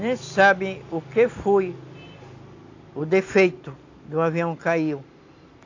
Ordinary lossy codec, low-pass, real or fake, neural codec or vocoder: AAC, 48 kbps; 7.2 kHz; real; none